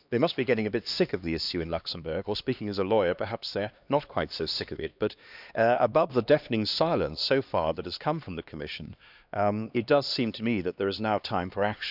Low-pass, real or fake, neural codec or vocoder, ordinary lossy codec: 5.4 kHz; fake; codec, 16 kHz, 2 kbps, X-Codec, HuBERT features, trained on LibriSpeech; none